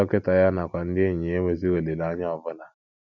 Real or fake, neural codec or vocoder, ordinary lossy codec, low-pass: real; none; none; 7.2 kHz